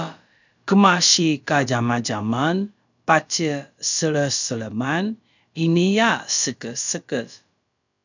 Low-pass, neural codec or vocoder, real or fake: 7.2 kHz; codec, 16 kHz, about 1 kbps, DyCAST, with the encoder's durations; fake